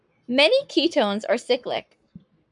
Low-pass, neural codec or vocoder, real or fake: 10.8 kHz; codec, 44.1 kHz, 7.8 kbps, Pupu-Codec; fake